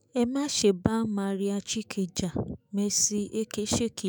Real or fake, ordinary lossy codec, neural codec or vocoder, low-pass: fake; none; autoencoder, 48 kHz, 128 numbers a frame, DAC-VAE, trained on Japanese speech; none